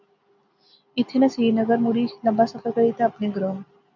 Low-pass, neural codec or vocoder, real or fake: 7.2 kHz; none; real